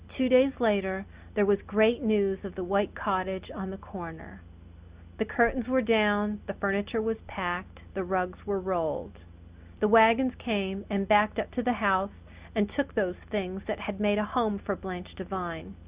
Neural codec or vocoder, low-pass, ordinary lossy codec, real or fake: none; 3.6 kHz; Opus, 64 kbps; real